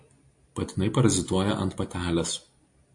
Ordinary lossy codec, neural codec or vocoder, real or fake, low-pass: AAC, 64 kbps; none; real; 10.8 kHz